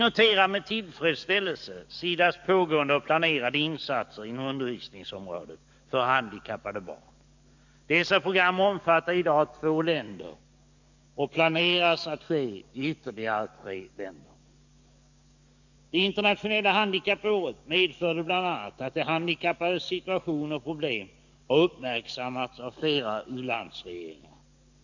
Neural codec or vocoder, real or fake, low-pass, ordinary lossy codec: codec, 44.1 kHz, 7.8 kbps, DAC; fake; 7.2 kHz; none